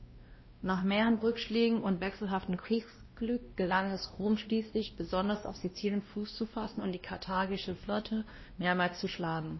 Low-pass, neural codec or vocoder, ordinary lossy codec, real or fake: 7.2 kHz; codec, 16 kHz, 1 kbps, X-Codec, WavLM features, trained on Multilingual LibriSpeech; MP3, 24 kbps; fake